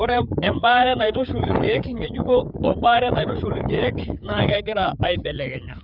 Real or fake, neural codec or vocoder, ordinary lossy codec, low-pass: fake; codec, 16 kHz, 4 kbps, FreqCodec, larger model; none; 5.4 kHz